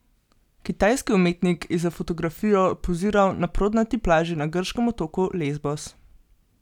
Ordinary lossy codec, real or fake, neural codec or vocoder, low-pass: none; real; none; 19.8 kHz